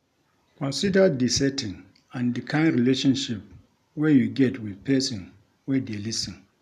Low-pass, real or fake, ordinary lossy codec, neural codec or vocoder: 14.4 kHz; real; none; none